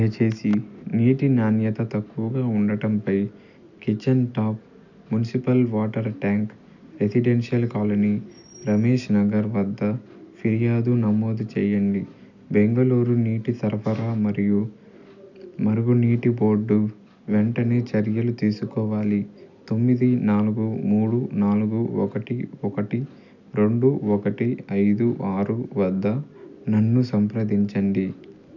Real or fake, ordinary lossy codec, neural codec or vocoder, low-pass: real; none; none; 7.2 kHz